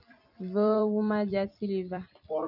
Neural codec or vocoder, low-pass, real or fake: vocoder, 44.1 kHz, 128 mel bands every 256 samples, BigVGAN v2; 5.4 kHz; fake